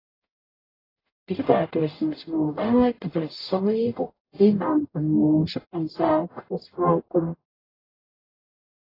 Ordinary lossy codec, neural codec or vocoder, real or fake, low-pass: AAC, 24 kbps; codec, 44.1 kHz, 0.9 kbps, DAC; fake; 5.4 kHz